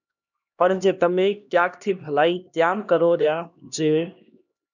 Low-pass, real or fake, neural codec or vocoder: 7.2 kHz; fake; codec, 16 kHz, 1 kbps, X-Codec, HuBERT features, trained on LibriSpeech